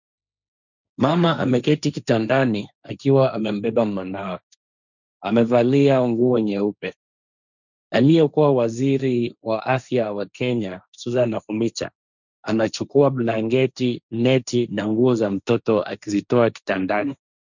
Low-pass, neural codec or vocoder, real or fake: 7.2 kHz; codec, 16 kHz, 1.1 kbps, Voila-Tokenizer; fake